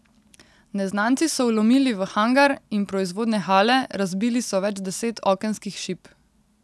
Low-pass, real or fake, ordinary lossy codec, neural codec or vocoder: none; real; none; none